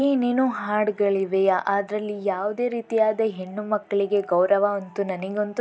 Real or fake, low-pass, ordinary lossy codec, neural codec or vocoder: real; none; none; none